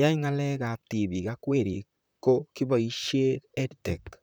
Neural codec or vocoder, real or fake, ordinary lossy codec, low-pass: vocoder, 44.1 kHz, 128 mel bands, Pupu-Vocoder; fake; none; none